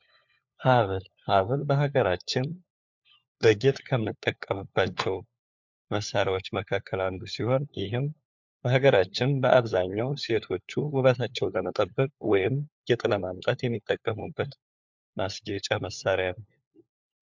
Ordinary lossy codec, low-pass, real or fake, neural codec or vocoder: MP3, 64 kbps; 7.2 kHz; fake; codec, 16 kHz, 4 kbps, FunCodec, trained on LibriTTS, 50 frames a second